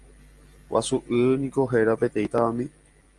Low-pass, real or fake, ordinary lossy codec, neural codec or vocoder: 10.8 kHz; real; Opus, 32 kbps; none